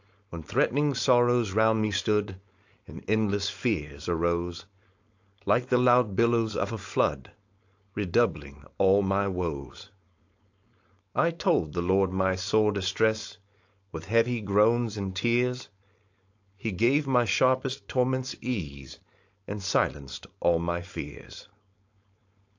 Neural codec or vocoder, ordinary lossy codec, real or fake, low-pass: codec, 16 kHz, 4.8 kbps, FACodec; AAC, 48 kbps; fake; 7.2 kHz